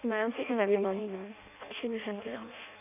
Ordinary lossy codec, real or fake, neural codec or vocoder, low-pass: none; fake; codec, 16 kHz in and 24 kHz out, 0.6 kbps, FireRedTTS-2 codec; 3.6 kHz